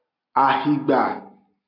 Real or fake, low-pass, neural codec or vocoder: real; 5.4 kHz; none